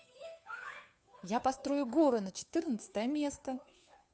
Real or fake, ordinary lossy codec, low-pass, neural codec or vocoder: fake; none; none; codec, 16 kHz, 2 kbps, FunCodec, trained on Chinese and English, 25 frames a second